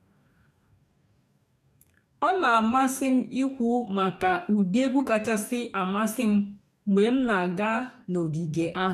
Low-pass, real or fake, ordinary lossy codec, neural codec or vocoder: 14.4 kHz; fake; none; codec, 44.1 kHz, 2.6 kbps, DAC